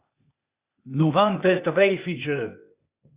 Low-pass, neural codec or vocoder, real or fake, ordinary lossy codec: 3.6 kHz; codec, 16 kHz, 0.8 kbps, ZipCodec; fake; Opus, 64 kbps